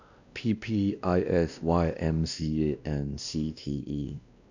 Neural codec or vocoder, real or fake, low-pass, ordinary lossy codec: codec, 16 kHz, 1 kbps, X-Codec, WavLM features, trained on Multilingual LibriSpeech; fake; 7.2 kHz; none